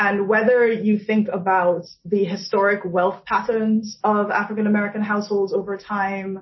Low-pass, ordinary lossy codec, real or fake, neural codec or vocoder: 7.2 kHz; MP3, 24 kbps; real; none